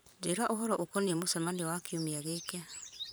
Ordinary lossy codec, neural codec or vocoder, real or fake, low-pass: none; vocoder, 44.1 kHz, 128 mel bands every 512 samples, BigVGAN v2; fake; none